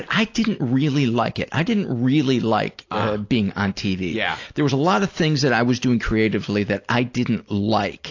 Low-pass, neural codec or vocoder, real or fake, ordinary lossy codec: 7.2 kHz; none; real; AAC, 48 kbps